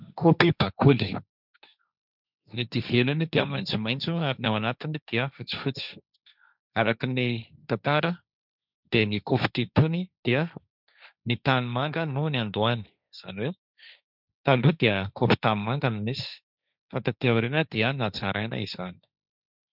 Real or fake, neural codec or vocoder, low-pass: fake; codec, 16 kHz, 1.1 kbps, Voila-Tokenizer; 5.4 kHz